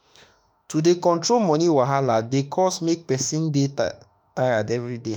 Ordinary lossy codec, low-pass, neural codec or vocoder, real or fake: none; 19.8 kHz; autoencoder, 48 kHz, 32 numbers a frame, DAC-VAE, trained on Japanese speech; fake